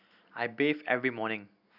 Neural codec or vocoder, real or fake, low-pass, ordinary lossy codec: none; real; 5.4 kHz; none